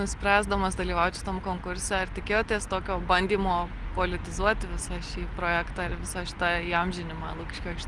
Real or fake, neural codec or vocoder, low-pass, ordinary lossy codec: real; none; 10.8 kHz; Opus, 24 kbps